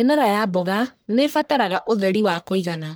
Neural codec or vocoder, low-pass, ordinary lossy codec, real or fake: codec, 44.1 kHz, 1.7 kbps, Pupu-Codec; none; none; fake